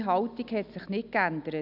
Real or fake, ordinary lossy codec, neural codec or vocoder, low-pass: real; none; none; 5.4 kHz